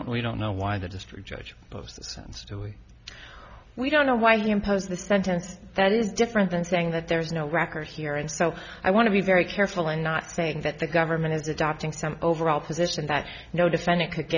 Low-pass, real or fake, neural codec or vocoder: 7.2 kHz; real; none